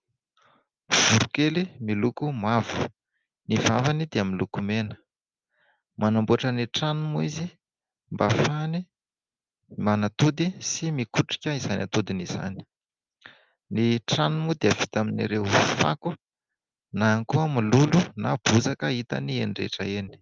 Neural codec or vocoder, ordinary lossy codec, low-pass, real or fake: none; Opus, 32 kbps; 7.2 kHz; real